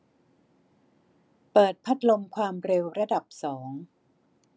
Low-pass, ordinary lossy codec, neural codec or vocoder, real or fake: none; none; none; real